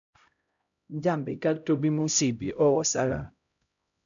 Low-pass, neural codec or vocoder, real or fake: 7.2 kHz; codec, 16 kHz, 0.5 kbps, X-Codec, HuBERT features, trained on LibriSpeech; fake